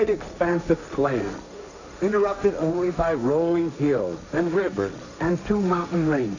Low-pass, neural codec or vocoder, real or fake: 7.2 kHz; codec, 16 kHz, 1.1 kbps, Voila-Tokenizer; fake